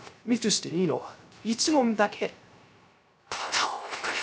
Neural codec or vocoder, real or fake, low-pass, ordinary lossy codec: codec, 16 kHz, 0.3 kbps, FocalCodec; fake; none; none